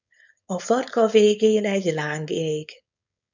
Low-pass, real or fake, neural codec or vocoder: 7.2 kHz; fake; codec, 16 kHz, 4.8 kbps, FACodec